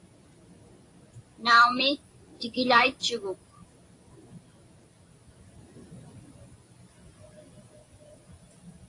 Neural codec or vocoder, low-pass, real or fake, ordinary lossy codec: vocoder, 44.1 kHz, 128 mel bands every 256 samples, BigVGAN v2; 10.8 kHz; fake; AAC, 48 kbps